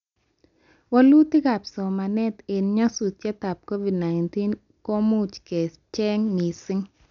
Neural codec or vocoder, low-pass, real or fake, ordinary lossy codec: none; 7.2 kHz; real; none